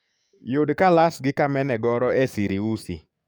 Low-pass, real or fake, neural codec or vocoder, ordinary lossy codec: none; fake; codec, 44.1 kHz, 7.8 kbps, DAC; none